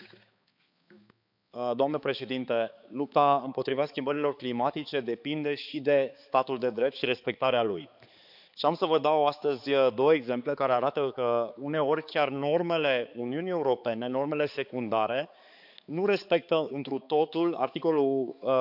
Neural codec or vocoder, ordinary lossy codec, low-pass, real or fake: codec, 16 kHz, 4 kbps, X-Codec, HuBERT features, trained on balanced general audio; none; 5.4 kHz; fake